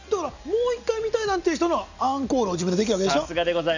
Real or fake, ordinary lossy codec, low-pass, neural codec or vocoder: real; none; 7.2 kHz; none